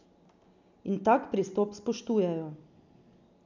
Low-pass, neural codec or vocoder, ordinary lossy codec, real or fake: 7.2 kHz; none; none; real